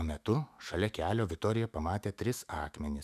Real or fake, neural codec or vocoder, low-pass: fake; autoencoder, 48 kHz, 128 numbers a frame, DAC-VAE, trained on Japanese speech; 14.4 kHz